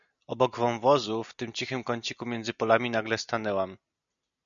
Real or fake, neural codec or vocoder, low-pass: real; none; 7.2 kHz